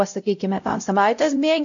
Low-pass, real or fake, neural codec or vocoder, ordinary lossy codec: 7.2 kHz; fake; codec, 16 kHz, 0.5 kbps, X-Codec, WavLM features, trained on Multilingual LibriSpeech; AAC, 48 kbps